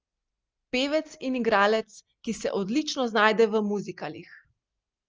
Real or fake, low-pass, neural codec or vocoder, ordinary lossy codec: real; 7.2 kHz; none; Opus, 24 kbps